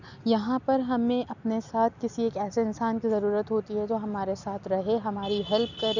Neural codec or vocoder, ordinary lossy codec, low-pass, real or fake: none; none; 7.2 kHz; real